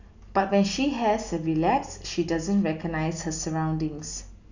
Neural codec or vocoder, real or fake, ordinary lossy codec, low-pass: none; real; none; 7.2 kHz